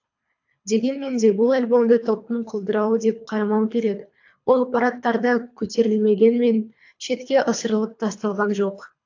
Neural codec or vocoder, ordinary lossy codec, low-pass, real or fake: codec, 24 kHz, 3 kbps, HILCodec; AAC, 48 kbps; 7.2 kHz; fake